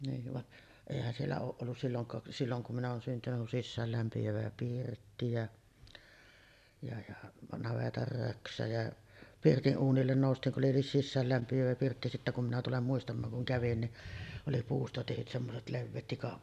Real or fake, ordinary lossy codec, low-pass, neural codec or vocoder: real; none; 14.4 kHz; none